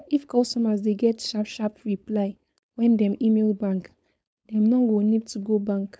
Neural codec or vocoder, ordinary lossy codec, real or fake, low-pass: codec, 16 kHz, 4.8 kbps, FACodec; none; fake; none